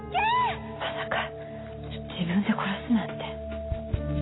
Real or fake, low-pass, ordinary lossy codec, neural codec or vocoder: real; 7.2 kHz; AAC, 16 kbps; none